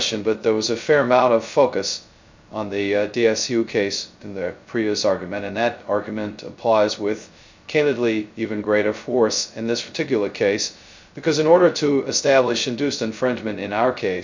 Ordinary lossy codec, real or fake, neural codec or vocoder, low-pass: MP3, 64 kbps; fake; codec, 16 kHz, 0.2 kbps, FocalCodec; 7.2 kHz